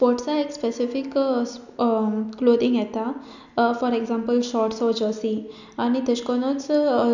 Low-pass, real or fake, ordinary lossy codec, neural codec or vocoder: 7.2 kHz; real; none; none